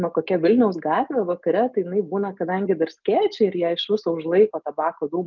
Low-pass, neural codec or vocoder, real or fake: 7.2 kHz; none; real